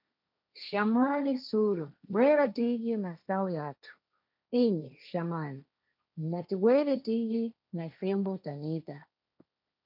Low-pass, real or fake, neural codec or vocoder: 5.4 kHz; fake; codec, 16 kHz, 1.1 kbps, Voila-Tokenizer